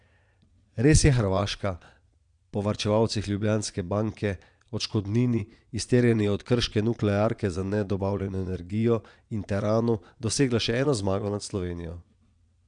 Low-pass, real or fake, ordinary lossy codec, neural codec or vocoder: 9.9 kHz; fake; none; vocoder, 22.05 kHz, 80 mel bands, Vocos